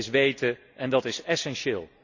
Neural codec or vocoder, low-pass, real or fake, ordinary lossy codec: none; 7.2 kHz; real; none